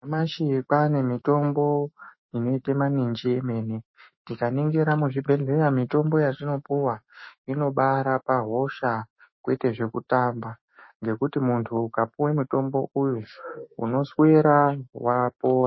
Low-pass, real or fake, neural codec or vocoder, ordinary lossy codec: 7.2 kHz; fake; autoencoder, 48 kHz, 128 numbers a frame, DAC-VAE, trained on Japanese speech; MP3, 24 kbps